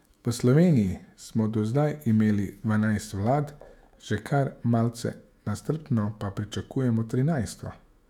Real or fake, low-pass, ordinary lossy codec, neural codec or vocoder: fake; 19.8 kHz; none; autoencoder, 48 kHz, 128 numbers a frame, DAC-VAE, trained on Japanese speech